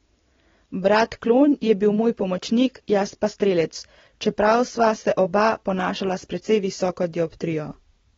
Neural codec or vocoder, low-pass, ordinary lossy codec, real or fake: none; 7.2 kHz; AAC, 24 kbps; real